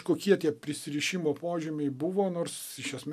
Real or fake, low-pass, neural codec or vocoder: real; 14.4 kHz; none